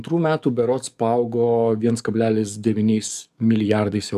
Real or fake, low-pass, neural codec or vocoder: fake; 14.4 kHz; codec, 44.1 kHz, 7.8 kbps, DAC